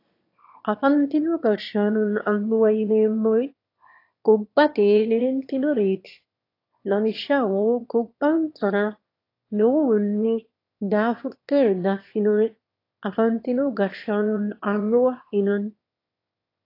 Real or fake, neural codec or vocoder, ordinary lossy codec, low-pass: fake; autoencoder, 22.05 kHz, a latent of 192 numbers a frame, VITS, trained on one speaker; AAC, 32 kbps; 5.4 kHz